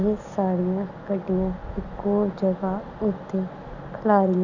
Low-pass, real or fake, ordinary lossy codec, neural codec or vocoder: 7.2 kHz; real; none; none